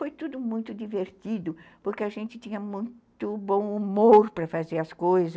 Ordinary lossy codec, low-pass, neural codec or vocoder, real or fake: none; none; none; real